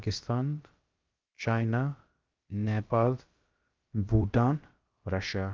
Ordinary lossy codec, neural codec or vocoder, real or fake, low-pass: Opus, 32 kbps; codec, 16 kHz, about 1 kbps, DyCAST, with the encoder's durations; fake; 7.2 kHz